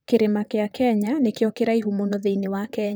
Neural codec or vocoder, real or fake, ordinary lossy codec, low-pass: vocoder, 44.1 kHz, 128 mel bands, Pupu-Vocoder; fake; none; none